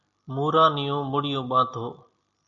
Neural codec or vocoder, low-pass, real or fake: none; 7.2 kHz; real